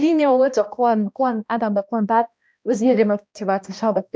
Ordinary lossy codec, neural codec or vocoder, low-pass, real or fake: none; codec, 16 kHz, 1 kbps, X-Codec, HuBERT features, trained on balanced general audio; none; fake